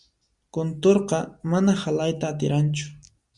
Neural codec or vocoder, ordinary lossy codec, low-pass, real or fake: none; Opus, 64 kbps; 10.8 kHz; real